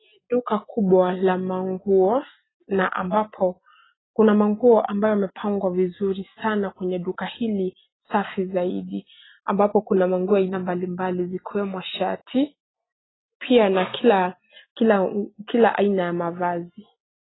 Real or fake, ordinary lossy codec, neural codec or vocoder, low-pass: real; AAC, 16 kbps; none; 7.2 kHz